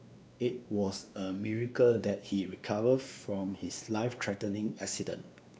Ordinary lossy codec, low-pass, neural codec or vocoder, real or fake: none; none; codec, 16 kHz, 2 kbps, X-Codec, WavLM features, trained on Multilingual LibriSpeech; fake